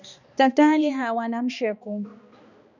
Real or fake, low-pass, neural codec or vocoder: fake; 7.2 kHz; codec, 16 kHz, 2 kbps, X-Codec, HuBERT features, trained on balanced general audio